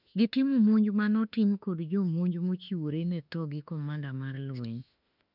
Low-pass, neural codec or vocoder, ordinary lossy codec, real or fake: 5.4 kHz; autoencoder, 48 kHz, 32 numbers a frame, DAC-VAE, trained on Japanese speech; none; fake